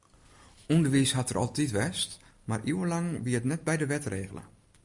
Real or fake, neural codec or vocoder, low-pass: real; none; 10.8 kHz